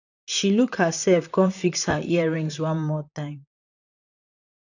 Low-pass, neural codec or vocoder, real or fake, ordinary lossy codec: 7.2 kHz; vocoder, 44.1 kHz, 128 mel bands, Pupu-Vocoder; fake; none